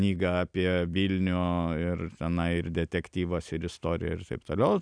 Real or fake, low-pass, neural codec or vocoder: real; 9.9 kHz; none